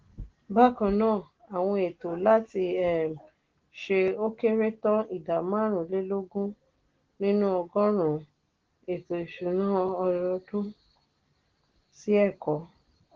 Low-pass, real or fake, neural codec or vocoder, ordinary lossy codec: 7.2 kHz; real; none; Opus, 16 kbps